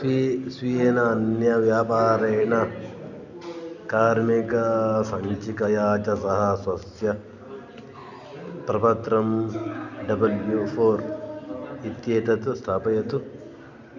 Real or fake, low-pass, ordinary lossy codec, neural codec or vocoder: real; 7.2 kHz; none; none